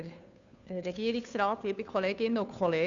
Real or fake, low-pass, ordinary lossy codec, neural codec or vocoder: fake; 7.2 kHz; none; codec, 16 kHz, 2 kbps, FunCodec, trained on Chinese and English, 25 frames a second